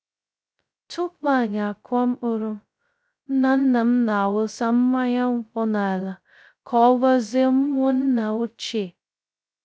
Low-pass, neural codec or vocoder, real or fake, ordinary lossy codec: none; codec, 16 kHz, 0.2 kbps, FocalCodec; fake; none